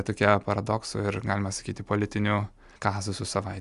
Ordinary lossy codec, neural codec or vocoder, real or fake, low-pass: Opus, 64 kbps; none; real; 10.8 kHz